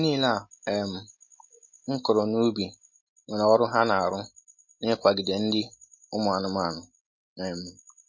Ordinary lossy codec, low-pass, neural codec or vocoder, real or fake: MP3, 32 kbps; 7.2 kHz; none; real